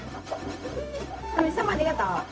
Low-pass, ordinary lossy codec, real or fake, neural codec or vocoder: none; none; fake; codec, 16 kHz, 0.4 kbps, LongCat-Audio-Codec